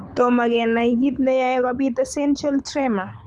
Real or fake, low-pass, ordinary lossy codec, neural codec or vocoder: fake; none; none; codec, 24 kHz, 6 kbps, HILCodec